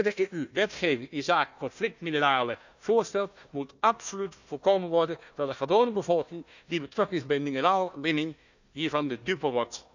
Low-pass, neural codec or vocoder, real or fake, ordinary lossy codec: 7.2 kHz; codec, 16 kHz, 1 kbps, FunCodec, trained on Chinese and English, 50 frames a second; fake; none